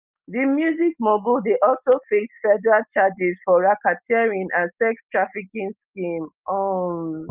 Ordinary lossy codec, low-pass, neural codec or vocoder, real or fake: Opus, 24 kbps; 3.6 kHz; none; real